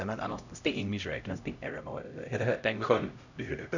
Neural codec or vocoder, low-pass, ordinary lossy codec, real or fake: codec, 16 kHz, 0.5 kbps, X-Codec, HuBERT features, trained on LibriSpeech; 7.2 kHz; none; fake